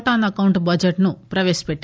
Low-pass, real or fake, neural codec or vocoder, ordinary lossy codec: none; real; none; none